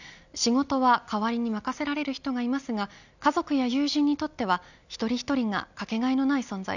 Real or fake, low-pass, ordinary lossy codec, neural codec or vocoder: real; 7.2 kHz; none; none